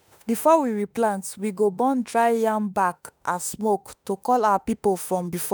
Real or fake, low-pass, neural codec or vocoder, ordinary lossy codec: fake; none; autoencoder, 48 kHz, 32 numbers a frame, DAC-VAE, trained on Japanese speech; none